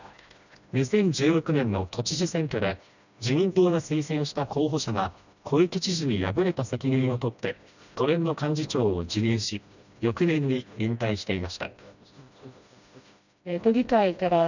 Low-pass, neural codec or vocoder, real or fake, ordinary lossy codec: 7.2 kHz; codec, 16 kHz, 1 kbps, FreqCodec, smaller model; fake; none